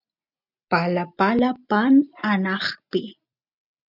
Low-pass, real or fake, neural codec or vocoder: 5.4 kHz; real; none